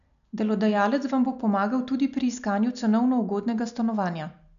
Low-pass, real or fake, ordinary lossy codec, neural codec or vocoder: 7.2 kHz; real; none; none